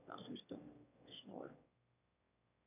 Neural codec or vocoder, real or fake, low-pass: autoencoder, 22.05 kHz, a latent of 192 numbers a frame, VITS, trained on one speaker; fake; 3.6 kHz